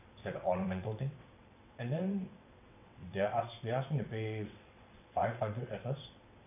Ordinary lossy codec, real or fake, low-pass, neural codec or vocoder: none; fake; 3.6 kHz; vocoder, 22.05 kHz, 80 mel bands, WaveNeXt